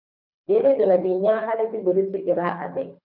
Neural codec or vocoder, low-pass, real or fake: codec, 24 kHz, 3 kbps, HILCodec; 5.4 kHz; fake